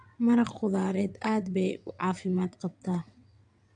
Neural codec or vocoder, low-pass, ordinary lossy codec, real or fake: vocoder, 22.05 kHz, 80 mel bands, WaveNeXt; 9.9 kHz; AAC, 64 kbps; fake